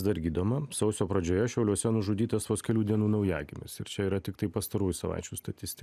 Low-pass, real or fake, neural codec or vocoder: 14.4 kHz; real; none